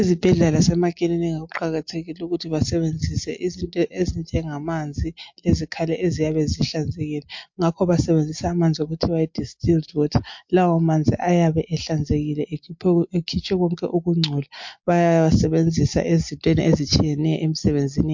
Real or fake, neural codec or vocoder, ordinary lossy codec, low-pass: real; none; MP3, 48 kbps; 7.2 kHz